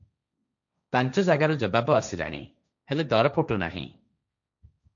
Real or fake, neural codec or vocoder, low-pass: fake; codec, 16 kHz, 1.1 kbps, Voila-Tokenizer; 7.2 kHz